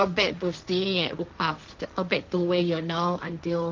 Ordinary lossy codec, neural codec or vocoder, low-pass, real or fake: Opus, 24 kbps; codec, 16 kHz, 1.1 kbps, Voila-Tokenizer; 7.2 kHz; fake